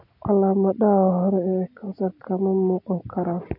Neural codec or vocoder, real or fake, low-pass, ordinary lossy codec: none; real; 5.4 kHz; none